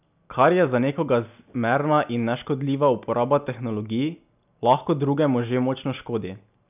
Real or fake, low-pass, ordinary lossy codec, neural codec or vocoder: real; 3.6 kHz; none; none